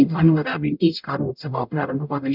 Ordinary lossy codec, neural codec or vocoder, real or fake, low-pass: none; codec, 44.1 kHz, 0.9 kbps, DAC; fake; 5.4 kHz